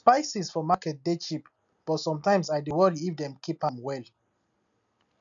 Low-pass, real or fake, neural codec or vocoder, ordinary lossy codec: 7.2 kHz; real; none; none